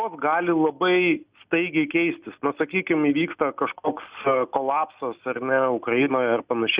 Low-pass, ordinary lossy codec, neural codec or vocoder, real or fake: 3.6 kHz; Opus, 64 kbps; none; real